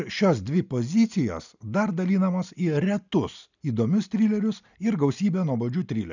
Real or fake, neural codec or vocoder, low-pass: real; none; 7.2 kHz